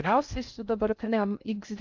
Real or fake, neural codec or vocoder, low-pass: fake; codec, 16 kHz in and 24 kHz out, 0.6 kbps, FocalCodec, streaming, 2048 codes; 7.2 kHz